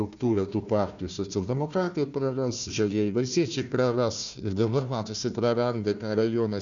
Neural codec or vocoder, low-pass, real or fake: codec, 16 kHz, 1 kbps, FunCodec, trained on Chinese and English, 50 frames a second; 7.2 kHz; fake